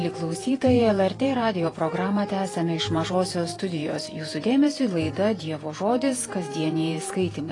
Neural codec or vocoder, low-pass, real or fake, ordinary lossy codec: vocoder, 48 kHz, 128 mel bands, Vocos; 10.8 kHz; fake; AAC, 32 kbps